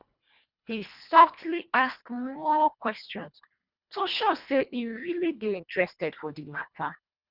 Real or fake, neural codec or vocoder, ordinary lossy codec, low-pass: fake; codec, 24 kHz, 1.5 kbps, HILCodec; Opus, 64 kbps; 5.4 kHz